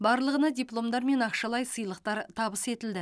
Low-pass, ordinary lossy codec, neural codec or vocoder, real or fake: none; none; none; real